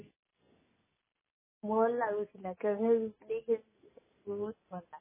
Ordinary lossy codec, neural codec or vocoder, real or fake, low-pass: MP3, 16 kbps; none; real; 3.6 kHz